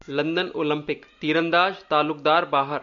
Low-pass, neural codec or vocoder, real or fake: 7.2 kHz; none; real